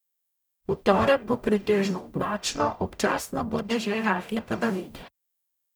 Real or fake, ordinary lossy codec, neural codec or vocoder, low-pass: fake; none; codec, 44.1 kHz, 0.9 kbps, DAC; none